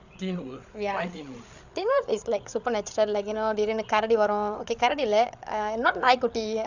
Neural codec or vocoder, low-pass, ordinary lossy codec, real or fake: codec, 16 kHz, 8 kbps, FreqCodec, larger model; 7.2 kHz; none; fake